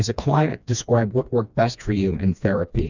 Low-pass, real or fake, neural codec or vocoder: 7.2 kHz; fake; codec, 16 kHz, 2 kbps, FreqCodec, smaller model